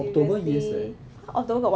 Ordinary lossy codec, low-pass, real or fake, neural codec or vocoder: none; none; real; none